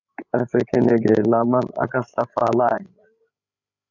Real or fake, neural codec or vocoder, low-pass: fake; codec, 16 kHz, 8 kbps, FreqCodec, larger model; 7.2 kHz